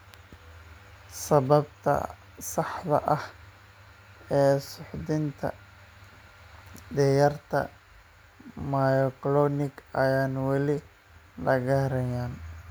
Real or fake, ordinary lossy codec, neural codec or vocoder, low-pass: real; none; none; none